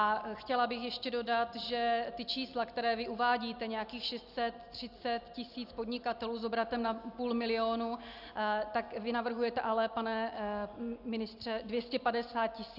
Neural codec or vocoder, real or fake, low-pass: none; real; 5.4 kHz